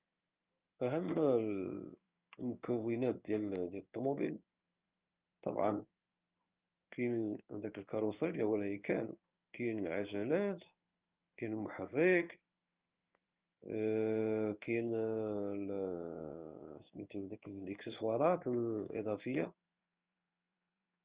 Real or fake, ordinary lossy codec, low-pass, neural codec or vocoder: fake; Opus, 24 kbps; 3.6 kHz; codec, 16 kHz in and 24 kHz out, 1 kbps, XY-Tokenizer